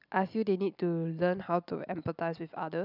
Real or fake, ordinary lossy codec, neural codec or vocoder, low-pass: real; none; none; 5.4 kHz